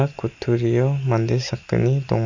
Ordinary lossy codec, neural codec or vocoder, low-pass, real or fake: none; none; 7.2 kHz; real